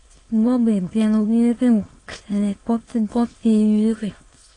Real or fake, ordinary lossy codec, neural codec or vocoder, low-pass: fake; AAC, 32 kbps; autoencoder, 22.05 kHz, a latent of 192 numbers a frame, VITS, trained on many speakers; 9.9 kHz